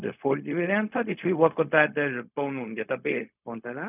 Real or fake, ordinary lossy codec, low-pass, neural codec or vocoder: fake; none; 3.6 kHz; codec, 16 kHz, 0.4 kbps, LongCat-Audio-Codec